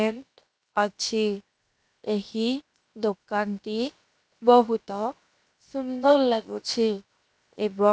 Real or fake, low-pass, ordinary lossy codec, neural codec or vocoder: fake; none; none; codec, 16 kHz, 0.7 kbps, FocalCodec